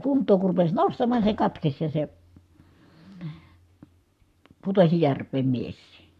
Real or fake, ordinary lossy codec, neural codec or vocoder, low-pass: fake; none; codec, 44.1 kHz, 7.8 kbps, Pupu-Codec; 14.4 kHz